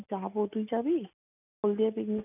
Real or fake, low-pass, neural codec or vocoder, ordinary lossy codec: real; 3.6 kHz; none; none